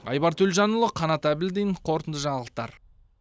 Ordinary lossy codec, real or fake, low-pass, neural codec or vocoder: none; real; none; none